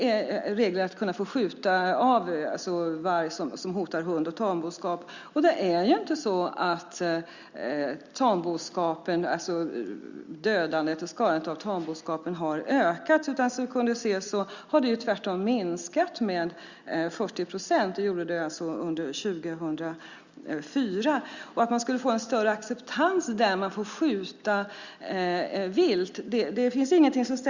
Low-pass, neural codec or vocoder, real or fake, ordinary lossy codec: 7.2 kHz; none; real; Opus, 64 kbps